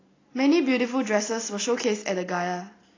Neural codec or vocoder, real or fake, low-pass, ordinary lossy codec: none; real; 7.2 kHz; AAC, 32 kbps